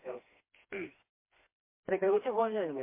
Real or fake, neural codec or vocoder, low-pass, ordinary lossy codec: fake; codec, 16 kHz, 2 kbps, FreqCodec, smaller model; 3.6 kHz; MP3, 24 kbps